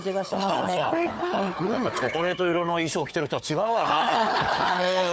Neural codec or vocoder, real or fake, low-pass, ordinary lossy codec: codec, 16 kHz, 4 kbps, FunCodec, trained on Chinese and English, 50 frames a second; fake; none; none